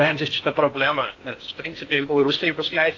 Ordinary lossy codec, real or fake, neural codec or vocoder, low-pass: AAC, 32 kbps; fake; codec, 16 kHz in and 24 kHz out, 0.8 kbps, FocalCodec, streaming, 65536 codes; 7.2 kHz